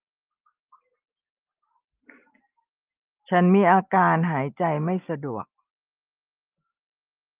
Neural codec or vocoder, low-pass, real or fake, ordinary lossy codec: none; 3.6 kHz; real; Opus, 24 kbps